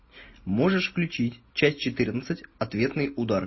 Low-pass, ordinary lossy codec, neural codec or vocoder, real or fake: 7.2 kHz; MP3, 24 kbps; none; real